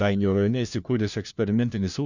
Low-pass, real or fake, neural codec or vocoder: 7.2 kHz; fake; codec, 16 kHz, 1 kbps, FunCodec, trained on LibriTTS, 50 frames a second